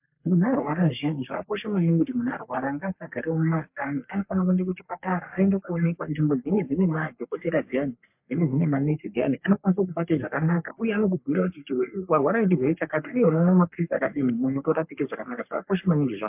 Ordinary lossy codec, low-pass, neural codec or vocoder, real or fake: MP3, 32 kbps; 3.6 kHz; codec, 44.1 kHz, 3.4 kbps, Pupu-Codec; fake